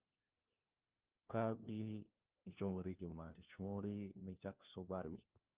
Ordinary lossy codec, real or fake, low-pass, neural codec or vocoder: Opus, 32 kbps; fake; 3.6 kHz; codec, 16 kHz, 1 kbps, FunCodec, trained on LibriTTS, 50 frames a second